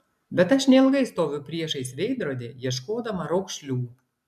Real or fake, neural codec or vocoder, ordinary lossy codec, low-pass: real; none; MP3, 96 kbps; 14.4 kHz